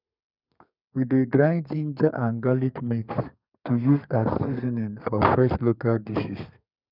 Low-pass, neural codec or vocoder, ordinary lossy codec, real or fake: 5.4 kHz; codec, 32 kHz, 1.9 kbps, SNAC; none; fake